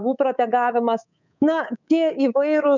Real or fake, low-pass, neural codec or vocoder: fake; 7.2 kHz; codec, 24 kHz, 3.1 kbps, DualCodec